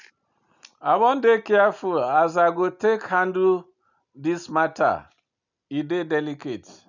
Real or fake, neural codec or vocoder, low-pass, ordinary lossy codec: real; none; 7.2 kHz; none